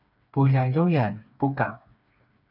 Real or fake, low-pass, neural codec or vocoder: fake; 5.4 kHz; codec, 16 kHz, 4 kbps, FreqCodec, smaller model